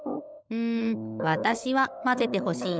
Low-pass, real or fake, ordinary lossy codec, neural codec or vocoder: none; fake; none; codec, 16 kHz, 16 kbps, FunCodec, trained on LibriTTS, 50 frames a second